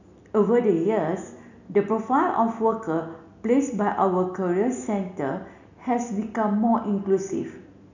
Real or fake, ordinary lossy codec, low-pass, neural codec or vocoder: real; none; 7.2 kHz; none